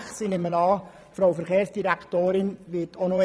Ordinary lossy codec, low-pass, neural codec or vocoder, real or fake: none; none; vocoder, 22.05 kHz, 80 mel bands, Vocos; fake